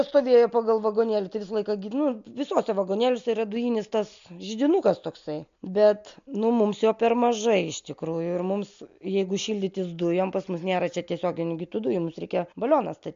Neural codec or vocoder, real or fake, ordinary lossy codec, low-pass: none; real; AAC, 64 kbps; 7.2 kHz